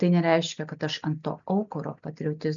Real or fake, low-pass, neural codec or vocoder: real; 7.2 kHz; none